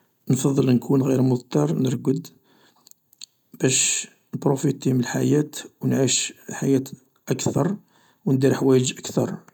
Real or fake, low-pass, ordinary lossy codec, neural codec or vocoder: real; 19.8 kHz; none; none